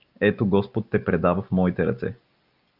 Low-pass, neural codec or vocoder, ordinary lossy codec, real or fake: 5.4 kHz; none; Opus, 24 kbps; real